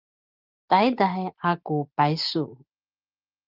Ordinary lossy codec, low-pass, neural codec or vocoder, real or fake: Opus, 24 kbps; 5.4 kHz; none; real